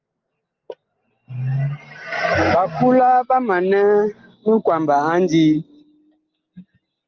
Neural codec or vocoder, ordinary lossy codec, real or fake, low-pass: none; Opus, 32 kbps; real; 7.2 kHz